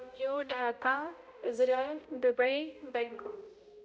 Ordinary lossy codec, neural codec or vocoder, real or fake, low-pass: none; codec, 16 kHz, 0.5 kbps, X-Codec, HuBERT features, trained on balanced general audio; fake; none